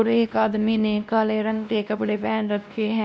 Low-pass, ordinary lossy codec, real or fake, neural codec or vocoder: none; none; fake; codec, 16 kHz, 1 kbps, X-Codec, WavLM features, trained on Multilingual LibriSpeech